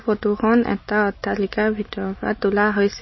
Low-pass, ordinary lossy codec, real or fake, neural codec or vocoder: 7.2 kHz; MP3, 24 kbps; real; none